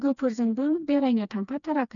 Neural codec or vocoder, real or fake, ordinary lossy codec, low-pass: codec, 16 kHz, 2 kbps, FreqCodec, smaller model; fake; none; 7.2 kHz